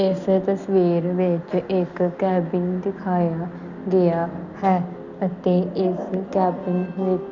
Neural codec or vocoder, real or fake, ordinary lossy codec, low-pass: none; real; none; 7.2 kHz